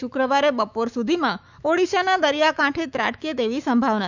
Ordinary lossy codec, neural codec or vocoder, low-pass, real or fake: none; autoencoder, 48 kHz, 128 numbers a frame, DAC-VAE, trained on Japanese speech; 7.2 kHz; fake